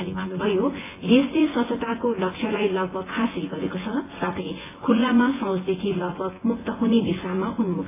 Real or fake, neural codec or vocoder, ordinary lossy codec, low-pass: fake; vocoder, 24 kHz, 100 mel bands, Vocos; AAC, 16 kbps; 3.6 kHz